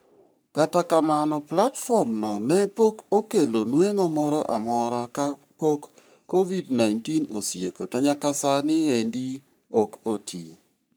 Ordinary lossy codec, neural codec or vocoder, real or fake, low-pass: none; codec, 44.1 kHz, 3.4 kbps, Pupu-Codec; fake; none